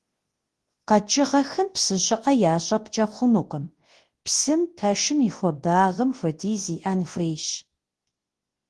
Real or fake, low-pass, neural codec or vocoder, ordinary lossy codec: fake; 10.8 kHz; codec, 24 kHz, 0.9 kbps, WavTokenizer, large speech release; Opus, 16 kbps